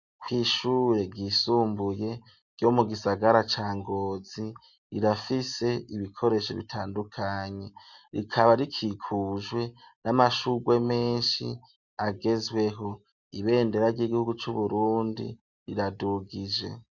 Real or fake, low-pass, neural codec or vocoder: real; 7.2 kHz; none